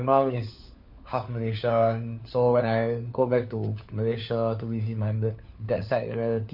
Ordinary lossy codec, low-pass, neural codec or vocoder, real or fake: none; 5.4 kHz; codec, 16 kHz, 4 kbps, FunCodec, trained on LibriTTS, 50 frames a second; fake